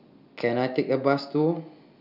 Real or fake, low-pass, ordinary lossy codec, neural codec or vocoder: real; 5.4 kHz; none; none